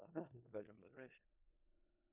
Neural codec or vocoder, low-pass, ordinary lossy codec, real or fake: codec, 16 kHz in and 24 kHz out, 0.4 kbps, LongCat-Audio-Codec, four codebook decoder; 3.6 kHz; Opus, 64 kbps; fake